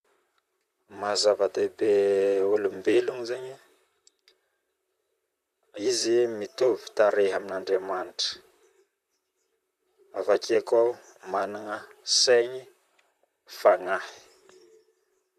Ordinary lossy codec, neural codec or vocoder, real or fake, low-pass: none; vocoder, 44.1 kHz, 128 mel bands, Pupu-Vocoder; fake; 14.4 kHz